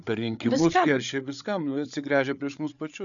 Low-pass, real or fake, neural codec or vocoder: 7.2 kHz; fake; codec, 16 kHz, 8 kbps, FreqCodec, larger model